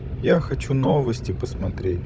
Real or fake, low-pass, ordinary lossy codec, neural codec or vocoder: fake; none; none; codec, 16 kHz, 16 kbps, FunCodec, trained on Chinese and English, 50 frames a second